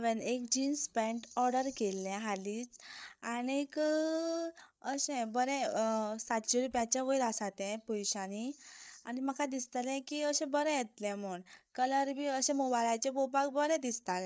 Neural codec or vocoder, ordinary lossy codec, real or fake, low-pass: codec, 16 kHz, 8 kbps, FreqCodec, larger model; none; fake; none